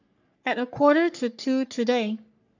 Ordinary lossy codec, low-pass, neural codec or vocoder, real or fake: none; 7.2 kHz; codec, 44.1 kHz, 3.4 kbps, Pupu-Codec; fake